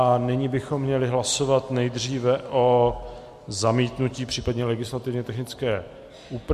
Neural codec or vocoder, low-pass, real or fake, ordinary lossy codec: none; 14.4 kHz; real; MP3, 64 kbps